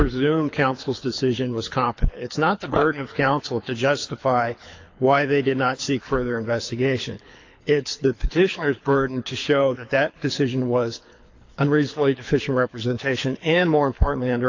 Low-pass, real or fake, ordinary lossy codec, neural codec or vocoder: 7.2 kHz; fake; AAC, 48 kbps; codec, 24 kHz, 6 kbps, HILCodec